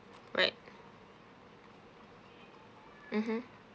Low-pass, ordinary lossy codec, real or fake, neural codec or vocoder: none; none; real; none